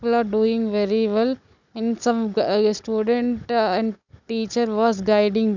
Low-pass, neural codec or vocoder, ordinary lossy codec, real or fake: 7.2 kHz; codec, 16 kHz, 16 kbps, FunCodec, trained on Chinese and English, 50 frames a second; none; fake